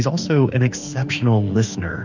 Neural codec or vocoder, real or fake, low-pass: autoencoder, 48 kHz, 32 numbers a frame, DAC-VAE, trained on Japanese speech; fake; 7.2 kHz